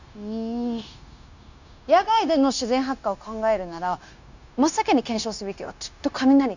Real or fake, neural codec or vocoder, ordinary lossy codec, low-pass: fake; codec, 16 kHz, 0.9 kbps, LongCat-Audio-Codec; none; 7.2 kHz